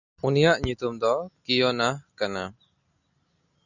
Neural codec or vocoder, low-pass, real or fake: none; 7.2 kHz; real